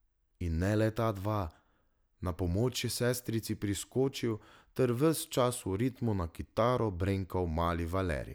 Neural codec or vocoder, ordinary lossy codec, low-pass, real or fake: none; none; none; real